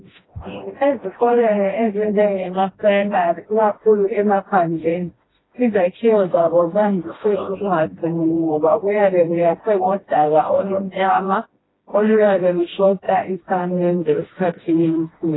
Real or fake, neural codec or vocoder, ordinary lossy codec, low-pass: fake; codec, 16 kHz, 1 kbps, FreqCodec, smaller model; AAC, 16 kbps; 7.2 kHz